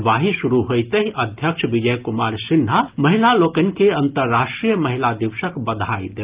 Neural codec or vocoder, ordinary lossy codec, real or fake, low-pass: none; Opus, 32 kbps; real; 3.6 kHz